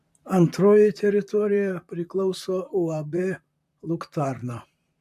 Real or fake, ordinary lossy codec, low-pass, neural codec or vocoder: fake; AAC, 96 kbps; 14.4 kHz; vocoder, 44.1 kHz, 128 mel bands, Pupu-Vocoder